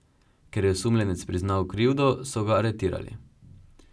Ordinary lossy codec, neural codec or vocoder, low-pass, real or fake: none; none; none; real